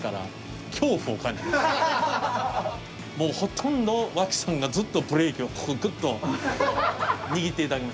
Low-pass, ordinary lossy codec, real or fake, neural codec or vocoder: none; none; real; none